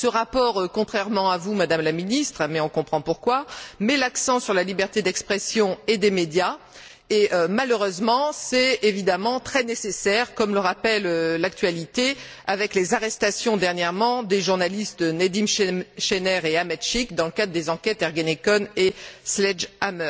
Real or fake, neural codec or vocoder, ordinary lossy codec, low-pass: real; none; none; none